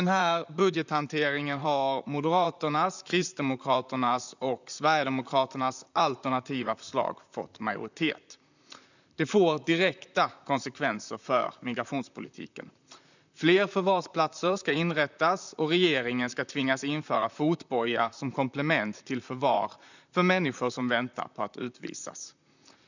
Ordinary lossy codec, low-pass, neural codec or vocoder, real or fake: none; 7.2 kHz; vocoder, 44.1 kHz, 128 mel bands, Pupu-Vocoder; fake